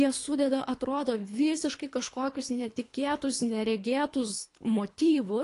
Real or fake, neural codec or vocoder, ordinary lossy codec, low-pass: fake; codec, 24 kHz, 3 kbps, HILCodec; AAC, 48 kbps; 10.8 kHz